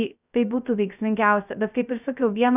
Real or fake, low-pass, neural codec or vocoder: fake; 3.6 kHz; codec, 16 kHz, 0.3 kbps, FocalCodec